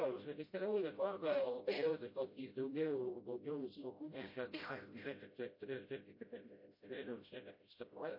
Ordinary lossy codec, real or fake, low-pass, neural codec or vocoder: MP3, 32 kbps; fake; 5.4 kHz; codec, 16 kHz, 0.5 kbps, FreqCodec, smaller model